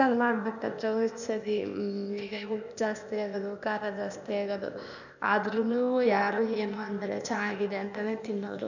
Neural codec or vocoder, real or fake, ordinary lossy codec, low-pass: codec, 16 kHz, 0.8 kbps, ZipCodec; fake; none; 7.2 kHz